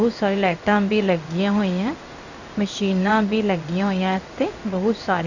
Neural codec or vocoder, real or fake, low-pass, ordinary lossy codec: codec, 16 kHz in and 24 kHz out, 1 kbps, XY-Tokenizer; fake; 7.2 kHz; none